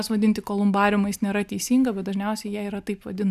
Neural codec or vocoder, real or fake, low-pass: none; real; 14.4 kHz